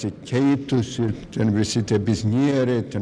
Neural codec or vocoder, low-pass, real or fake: none; 9.9 kHz; real